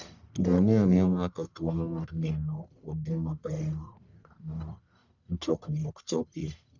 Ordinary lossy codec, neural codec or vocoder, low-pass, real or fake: none; codec, 44.1 kHz, 1.7 kbps, Pupu-Codec; 7.2 kHz; fake